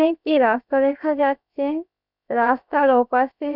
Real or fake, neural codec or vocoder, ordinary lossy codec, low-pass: fake; codec, 16 kHz, about 1 kbps, DyCAST, with the encoder's durations; none; 5.4 kHz